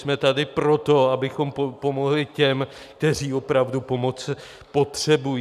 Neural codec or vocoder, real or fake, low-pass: none; real; 14.4 kHz